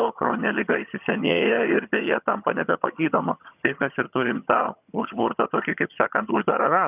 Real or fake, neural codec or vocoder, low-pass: fake; vocoder, 22.05 kHz, 80 mel bands, HiFi-GAN; 3.6 kHz